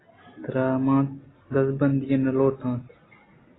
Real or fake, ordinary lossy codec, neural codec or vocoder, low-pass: real; AAC, 16 kbps; none; 7.2 kHz